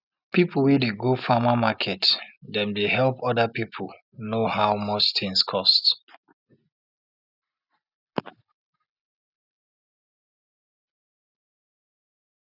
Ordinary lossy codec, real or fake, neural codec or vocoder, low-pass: none; real; none; 5.4 kHz